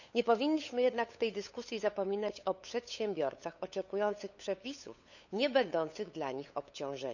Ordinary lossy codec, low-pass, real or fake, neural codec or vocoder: none; 7.2 kHz; fake; codec, 16 kHz, 8 kbps, FunCodec, trained on LibriTTS, 25 frames a second